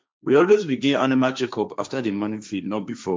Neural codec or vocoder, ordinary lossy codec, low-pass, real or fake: codec, 16 kHz, 1.1 kbps, Voila-Tokenizer; none; none; fake